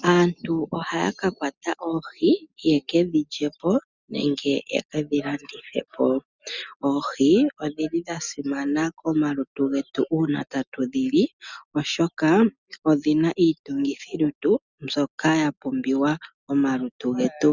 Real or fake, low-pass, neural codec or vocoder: real; 7.2 kHz; none